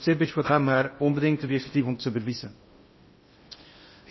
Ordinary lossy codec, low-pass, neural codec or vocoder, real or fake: MP3, 24 kbps; 7.2 kHz; codec, 16 kHz in and 24 kHz out, 0.6 kbps, FocalCodec, streaming, 2048 codes; fake